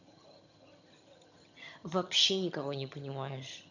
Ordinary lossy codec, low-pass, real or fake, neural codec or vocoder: none; 7.2 kHz; fake; vocoder, 22.05 kHz, 80 mel bands, HiFi-GAN